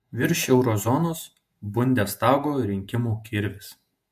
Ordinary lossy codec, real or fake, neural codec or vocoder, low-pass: MP3, 64 kbps; fake; vocoder, 48 kHz, 128 mel bands, Vocos; 14.4 kHz